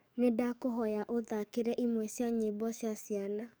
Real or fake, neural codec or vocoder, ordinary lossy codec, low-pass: fake; codec, 44.1 kHz, 7.8 kbps, DAC; none; none